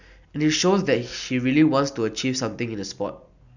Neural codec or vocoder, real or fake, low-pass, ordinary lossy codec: none; real; 7.2 kHz; none